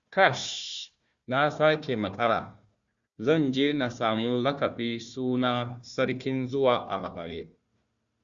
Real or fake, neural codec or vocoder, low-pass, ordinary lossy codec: fake; codec, 16 kHz, 1 kbps, FunCodec, trained on Chinese and English, 50 frames a second; 7.2 kHz; Opus, 64 kbps